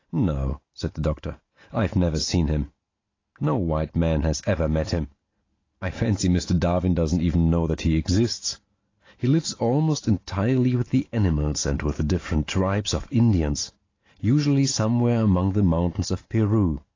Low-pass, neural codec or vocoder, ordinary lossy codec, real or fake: 7.2 kHz; none; AAC, 32 kbps; real